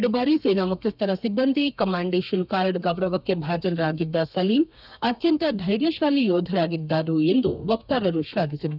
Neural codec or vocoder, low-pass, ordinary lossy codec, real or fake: codec, 32 kHz, 1.9 kbps, SNAC; 5.4 kHz; none; fake